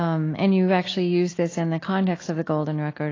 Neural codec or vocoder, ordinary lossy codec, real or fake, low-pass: none; AAC, 32 kbps; real; 7.2 kHz